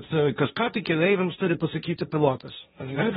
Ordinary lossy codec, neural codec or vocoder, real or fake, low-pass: AAC, 16 kbps; codec, 16 kHz, 1.1 kbps, Voila-Tokenizer; fake; 7.2 kHz